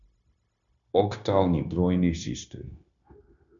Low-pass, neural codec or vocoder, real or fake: 7.2 kHz; codec, 16 kHz, 0.9 kbps, LongCat-Audio-Codec; fake